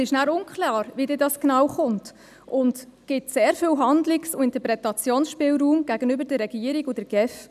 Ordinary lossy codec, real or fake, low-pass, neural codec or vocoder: none; real; 14.4 kHz; none